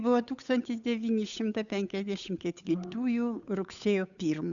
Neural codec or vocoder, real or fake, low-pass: codec, 16 kHz, 8 kbps, FunCodec, trained on Chinese and English, 25 frames a second; fake; 7.2 kHz